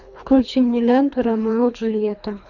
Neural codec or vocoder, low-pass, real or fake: codec, 24 kHz, 3 kbps, HILCodec; 7.2 kHz; fake